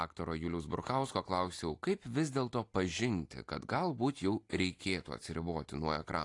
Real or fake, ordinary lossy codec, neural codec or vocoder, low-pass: real; AAC, 48 kbps; none; 10.8 kHz